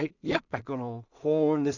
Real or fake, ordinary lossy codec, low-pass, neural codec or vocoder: fake; none; 7.2 kHz; codec, 16 kHz in and 24 kHz out, 0.4 kbps, LongCat-Audio-Codec, two codebook decoder